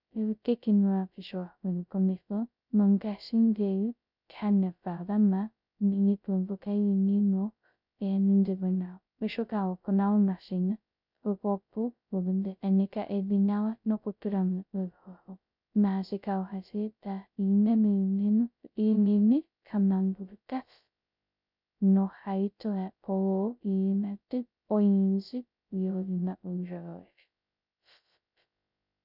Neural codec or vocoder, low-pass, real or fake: codec, 16 kHz, 0.2 kbps, FocalCodec; 5.4 kHz; fake